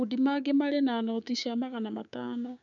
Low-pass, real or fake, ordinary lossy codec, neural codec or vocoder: 7.2 kHz; fake; none; codec, 16 kHz, 6 kbps, DAC